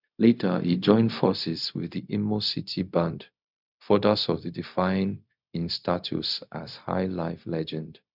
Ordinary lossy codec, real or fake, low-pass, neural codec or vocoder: none; fake; 5.4 kHz; codec, 16 kHz, 0.4 kbps, LongCat-Audio-Codec